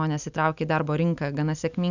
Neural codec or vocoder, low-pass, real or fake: none; 7.2 kHz; real